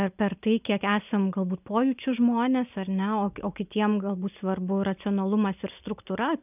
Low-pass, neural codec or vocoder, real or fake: 3.6 kHz; none; real